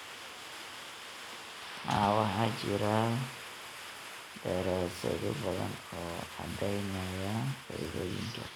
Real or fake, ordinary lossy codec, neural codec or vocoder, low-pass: real; none; none; none